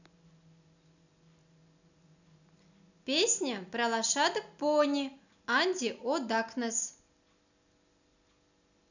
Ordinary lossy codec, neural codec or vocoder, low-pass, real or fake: none; none; 7.2 kHz; real